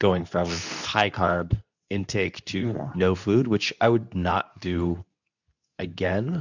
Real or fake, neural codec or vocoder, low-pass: fake; codec, 24 kHz, 0.9 kbps, WavTokenizer, medium speech release version 2; 7.2 kHz